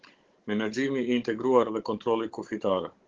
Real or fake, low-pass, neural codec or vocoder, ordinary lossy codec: fake; 7.2 kHz; codec, 16 kHz, 16 kbps, FunCodec, trained on Chinese and English, 50 frames a second; Opus, 16 kbps